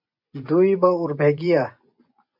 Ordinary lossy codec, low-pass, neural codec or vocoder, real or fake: MP3, 48 kbps; 5.4 kHz; vocoder, 24 kHz, 100 mel bands, Vocos; fake